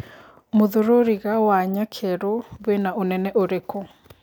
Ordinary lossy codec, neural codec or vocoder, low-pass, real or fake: none; none; 19.8 kHz; real